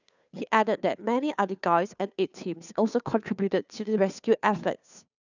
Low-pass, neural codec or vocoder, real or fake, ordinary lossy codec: 7.2 kHz; codec, 16 kHz, 2 kbps, FunCodec, trained on Chinese and English, 25 frames a second; fake; none